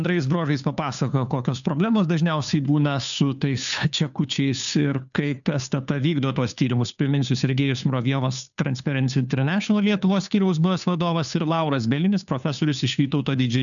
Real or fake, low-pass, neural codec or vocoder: fake; 7.2 kHz; codec, 16 kHz, 2 kbps, FunCodec, trained on Chinese and English, 25 frames a second